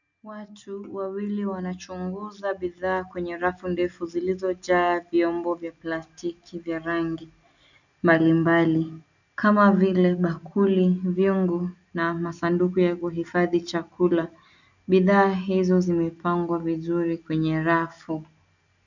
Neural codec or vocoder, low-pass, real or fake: none; 7.2 kHz; real